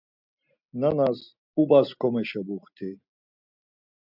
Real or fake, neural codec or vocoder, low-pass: real; none; 5.4 kHz